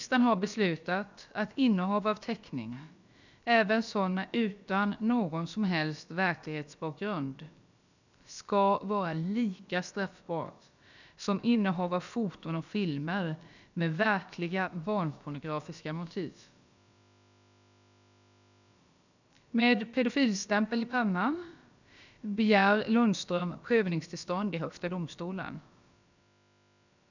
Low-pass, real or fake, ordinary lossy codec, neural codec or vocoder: 7.2 kHz; fake; none; codec, 16 kHz, about 1 kbps, DyCAST, with the encoder's durations